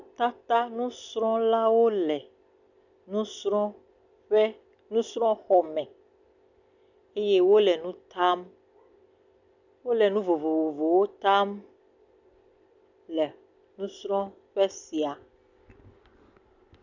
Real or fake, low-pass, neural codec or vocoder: real; 7.2 kHz; none